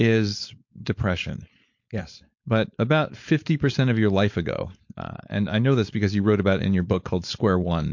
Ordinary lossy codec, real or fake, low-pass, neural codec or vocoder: MP3, 48 kbps; fake; 7.2 kHz; codec, 16 kHz, 4.8 kbps, FACodec